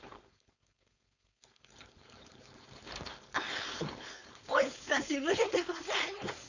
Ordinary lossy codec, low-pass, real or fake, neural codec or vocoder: none; 7.2 kHz; fake; codec, 16 kHz, 4.8 kbps, FACodec